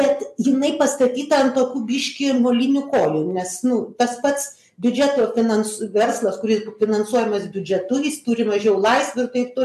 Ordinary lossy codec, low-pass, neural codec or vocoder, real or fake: MP3, 96 kbps; 14.4 kHz; none; real